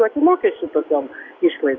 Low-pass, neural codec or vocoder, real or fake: 7.2 kHz; none; real